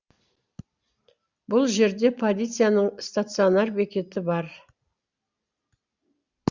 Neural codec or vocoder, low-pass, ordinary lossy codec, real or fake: none; 7.2 kHz; Opus, 64 kbps; real